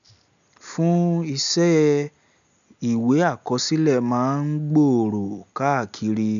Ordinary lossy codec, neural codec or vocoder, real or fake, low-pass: none; none; real; 7.2 kHz